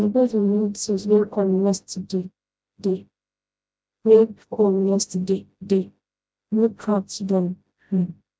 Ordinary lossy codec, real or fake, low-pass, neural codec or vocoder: none; fake; none; codec, 16 kHz, 0.5 kbps, FreqCodec, smaller model